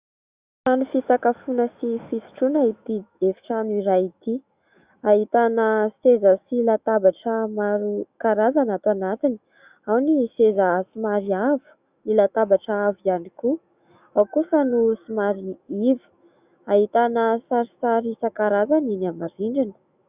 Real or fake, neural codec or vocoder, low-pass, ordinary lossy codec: fake; autoencoder, 48 kHz, 128 numbers a frame, DAC-VAE, trained on Japanese speech; 3.6 kHz; Opus, 64 kbps